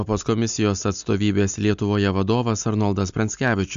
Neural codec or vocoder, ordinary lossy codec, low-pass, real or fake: none; AAC, 96 kbps; 7.2 kHz; real